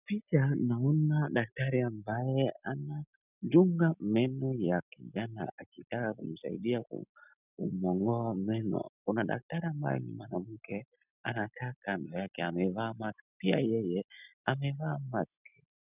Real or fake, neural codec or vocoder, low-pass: real; none; 3.6 kHz